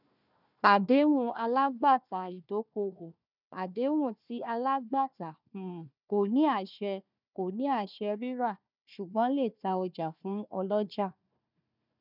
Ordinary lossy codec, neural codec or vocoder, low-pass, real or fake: none; codec, 16 kHz, 1 kbps, FunCodec, trained on Chinese and English, 50 frames a second; 5.4 kHz; fake